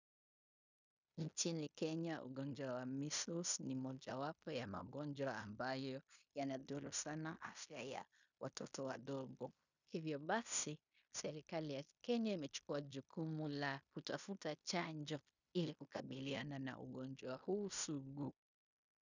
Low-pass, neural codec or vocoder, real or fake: 7.2 kHz; codec, 16 kHz in and 24 kHz out, 0.9 kbps, LongCat-Audio-Codec, fine tuned four codebook decoder; fake